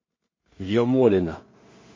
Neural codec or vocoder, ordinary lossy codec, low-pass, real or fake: codec, 16 kHz in and 24 kHz out, 0.4 kbps, LongCat-Audio-Codec, two codebook decoder; MP3, 32 kbps; 7.2 kHz; fake